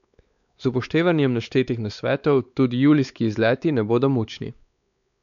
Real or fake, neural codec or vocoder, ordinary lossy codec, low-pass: fake; codec, 16 kHz, 4 kbps, X-Codec, WavLM features, trained on Multilingual LibriSpeech; none; 7.2 kHz